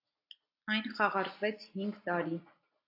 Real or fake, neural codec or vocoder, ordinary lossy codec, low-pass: real; none; AAC, 32 kbps; 5.4 kHz